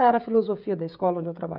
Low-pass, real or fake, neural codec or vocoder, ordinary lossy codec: 5.4 kHz; fake; codec, 16 kHz, 16 kbps, FreqCodec, smaller model; none